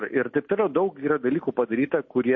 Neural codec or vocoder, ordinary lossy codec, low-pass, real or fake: none; MP3, 32 kbps; 7.2 kHz; real